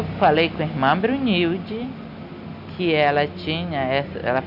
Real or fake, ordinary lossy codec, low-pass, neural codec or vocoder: real; none; 5.4 kHz; none